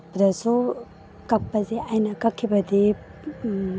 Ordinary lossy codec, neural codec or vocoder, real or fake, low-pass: none; none; real; none